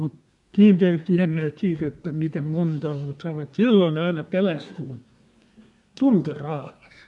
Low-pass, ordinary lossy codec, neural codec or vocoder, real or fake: 10.8 kHz; none; codec, 24 kHz, 1 kbps, SNAC; fake